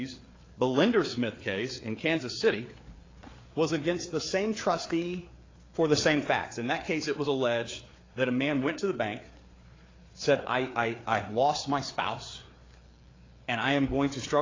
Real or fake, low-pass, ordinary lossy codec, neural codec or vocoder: fake; 7.2 kHz; AAC, 32 kbps; codec, 16 kHz, 4 kbps, FreqCodec, larger model